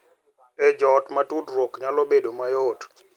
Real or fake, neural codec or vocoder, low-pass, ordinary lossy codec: real; none; 19.8 kHz; Opus, 24 kbps